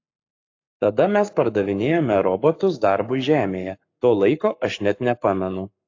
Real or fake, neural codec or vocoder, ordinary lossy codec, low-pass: fake; codec, 44.1 kHz, 7.8 kbps, Pupu-Codec; AAC, 48 kbps; 7.2 kHz